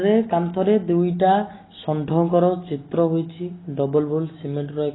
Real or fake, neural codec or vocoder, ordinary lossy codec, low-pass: real; none; AAC, 16 kbps; 7.2 kHz